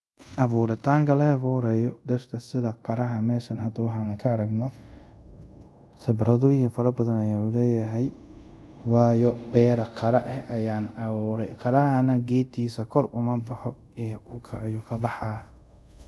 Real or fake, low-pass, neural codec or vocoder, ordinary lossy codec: fake; none; codec, 24 kHz, 0.5 kbps, DualCodec; none